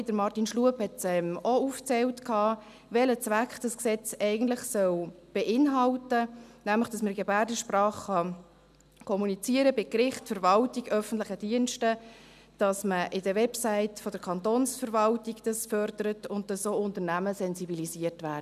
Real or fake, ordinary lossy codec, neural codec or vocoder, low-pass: real; none; none; 14.4 kHz